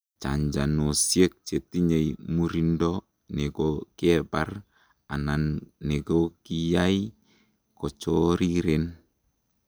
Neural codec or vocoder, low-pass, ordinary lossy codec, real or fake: none; none; none; real